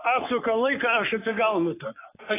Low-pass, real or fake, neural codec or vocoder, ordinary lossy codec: 3.6 kHz; fake; codec, 44.1 kHz, 3.4 kbps, Pupu-Codec; AAC, 16 kbps